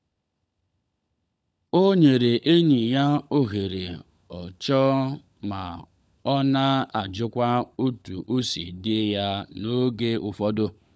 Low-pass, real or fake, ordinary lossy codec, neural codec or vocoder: none; fake; none; codec, 16 kHz, 16 kbps, FunCodec, trained on LibriTTS, 50 frames a second